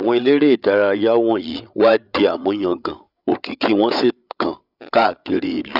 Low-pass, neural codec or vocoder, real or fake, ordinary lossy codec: 5.4 kHz; codec, 16 kHz, 8 kbps, FreqCodec, larger model; fake; none